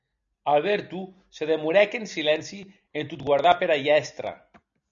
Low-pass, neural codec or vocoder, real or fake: 7.2 kHz; none; real